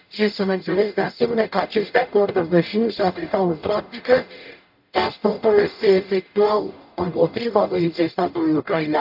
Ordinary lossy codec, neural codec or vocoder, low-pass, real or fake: AAC, 48 kbps; codec, 44.1 kHz, 0.9 kbps, DAC; 5.4 kHz; fake